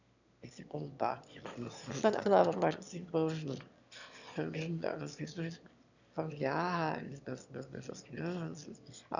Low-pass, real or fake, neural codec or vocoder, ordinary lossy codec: 7.2 kHz; fake; autoencoder, 22.05 kHz, a latent of 192 numbers a frame, VITS, trained on one speaker; none